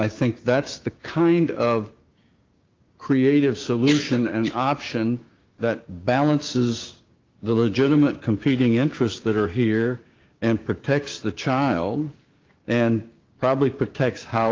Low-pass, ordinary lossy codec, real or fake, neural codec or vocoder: 7.2 kHz; Opus, 24 kbps; fake; codec, 16 kHz, 6 kbps, DAC